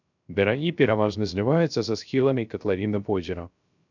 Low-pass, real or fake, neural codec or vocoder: 7.2 kHz; fake; codec, 16 kHz, 0.3 kbps, FocalCodec